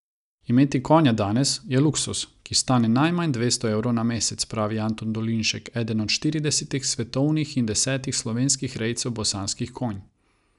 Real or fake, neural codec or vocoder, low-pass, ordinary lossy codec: real; none; 10.8 kHz; none